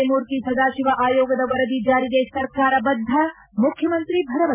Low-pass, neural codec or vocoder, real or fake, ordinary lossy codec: 3.6 kHz; none; real; none